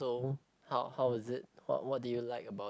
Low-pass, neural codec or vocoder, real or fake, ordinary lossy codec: none; none; real; none